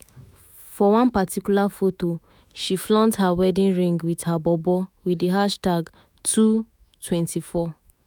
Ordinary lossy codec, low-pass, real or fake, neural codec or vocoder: none; none; fake; autoencoder, 48 kHz, 128 numbers a frame, DAC-VAE, trained on Japanese speech